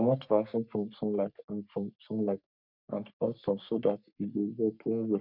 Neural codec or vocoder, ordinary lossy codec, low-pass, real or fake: codec, 44.1 kHz, 3.4 kbps, Pupu-Codec; none; 5.4 kHz; fake